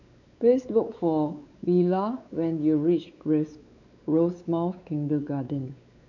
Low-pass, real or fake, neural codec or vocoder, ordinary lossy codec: 7.2 kHz; fake; codec, 16 kHz, 4 kbps, X-Codec, WavLM features, trained on Multilingual LibriSpeech; none